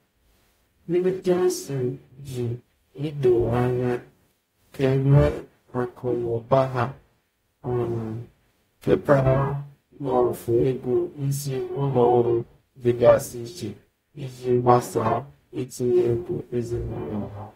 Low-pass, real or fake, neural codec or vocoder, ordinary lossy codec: 19.8 kHz; fake; codec, 44.1 kHz, 0.9 kbps, DAC; AAC, 48 kbps